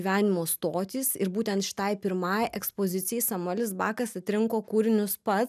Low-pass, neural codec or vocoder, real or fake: 14.4 kHz; none; real